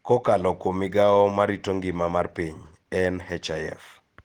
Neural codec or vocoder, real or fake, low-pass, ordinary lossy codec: none; real; 19.8 kHz; Opus, 16 kbps